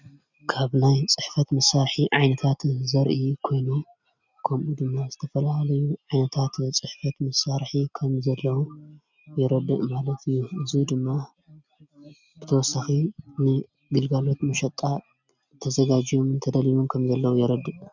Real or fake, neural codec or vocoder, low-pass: real; none; 7.2 kHz